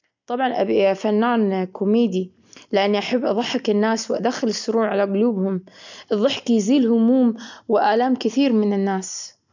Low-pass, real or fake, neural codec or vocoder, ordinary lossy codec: 7.2 kHz; real; none; none